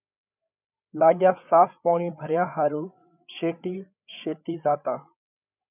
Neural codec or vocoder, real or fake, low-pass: codec, 16 kHz, 8 kbps, FreqCodec, larger model; fake; 3.6 kHz